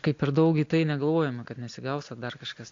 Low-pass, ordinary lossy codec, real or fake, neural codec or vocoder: 7.2 kHz; AAC, 48 kbps; real; none